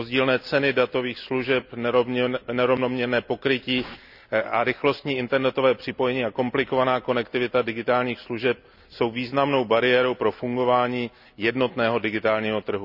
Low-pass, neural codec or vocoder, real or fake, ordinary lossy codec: 5.4 kHz; none; real; none